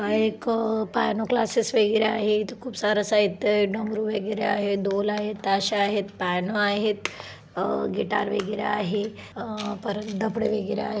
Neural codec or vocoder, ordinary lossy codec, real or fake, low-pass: none; none; real; none